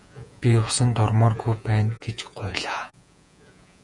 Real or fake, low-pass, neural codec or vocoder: fake; 10.8 kHz; vocoder, 48 kHz, 128 mel bands, Vocos